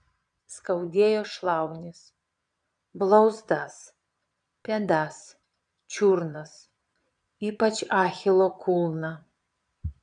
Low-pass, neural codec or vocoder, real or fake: 9.9 kHz; vocoder, 22.05 kHz, 80 mel bands, Vocos; fake